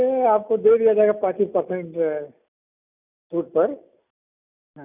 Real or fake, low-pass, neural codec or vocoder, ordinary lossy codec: real; 3.6 kHz; none; none